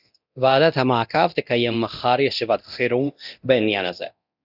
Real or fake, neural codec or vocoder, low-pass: fake; codec, 24 kHz, 0.9 kbps, DualCodec; 5.4 kHz